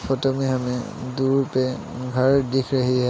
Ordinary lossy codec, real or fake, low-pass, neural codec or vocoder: none; real; none; none